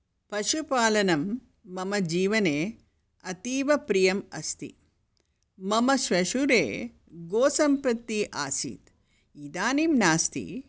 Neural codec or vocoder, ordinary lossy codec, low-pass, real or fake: none; none; none; real